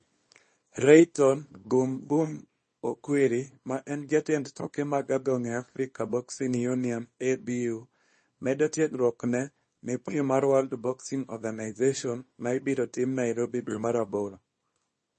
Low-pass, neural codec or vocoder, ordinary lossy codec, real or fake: 10.8 kHz; codec, 24 kHz, 0.9 kbps, WavTokenizer, small release; MP3, 32 kbps; fake